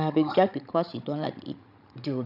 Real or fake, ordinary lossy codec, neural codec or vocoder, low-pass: fake; none; codec, 16 kHz, 16 kbps, FreqCodec, larger model; 5.4 kHz